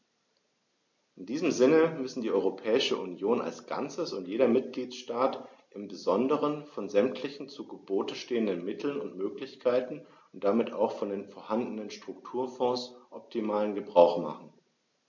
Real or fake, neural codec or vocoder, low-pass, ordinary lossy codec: real; none; 7.2 kHz; MP3, 48 kbps